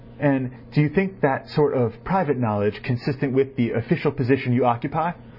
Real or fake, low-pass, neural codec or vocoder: real; 5.4 kHz; none